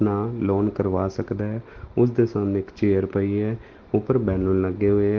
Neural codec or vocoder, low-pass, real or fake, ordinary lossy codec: none; 7.2 kHz; real; Opus, 16 kbps